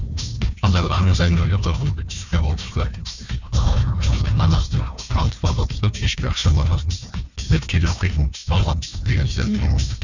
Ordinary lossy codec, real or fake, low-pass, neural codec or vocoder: none; fake; 7.2 kHz; codec, 16 kHz, 1 kbps, FunCodec, trained on Chinese and English, 50 frames a second